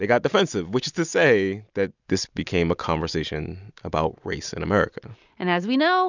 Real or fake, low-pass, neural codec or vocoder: real; 7.2 kHz; none